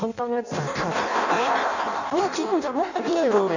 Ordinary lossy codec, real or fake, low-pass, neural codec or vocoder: none; fake; 7.2 kHz; codec, 16 kHz in and 24 kHz out, 0.6 kbps, FireRedTTS-2 codec